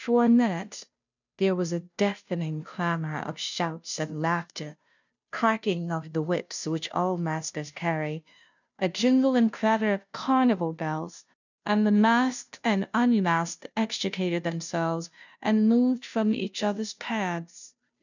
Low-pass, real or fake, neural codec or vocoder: 7.2 kHz; fake; codec, 16 kHz, 0.5 kbps, FunCodec, trained on Chinese and English, 25 frames a second